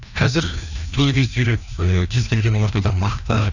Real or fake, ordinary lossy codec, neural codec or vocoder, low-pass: fake; none; codec, 16 kHz, 1 kbps, FreqCodec, larger model; 7.2 kHz